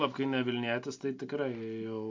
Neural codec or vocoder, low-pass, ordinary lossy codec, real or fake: none; 7.2 kHz; MP3, 48 kbps; real